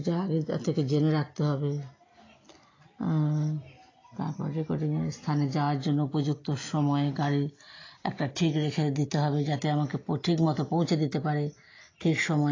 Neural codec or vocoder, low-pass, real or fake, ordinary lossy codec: none; 7.2 kHz; real; AAC, 32 kbps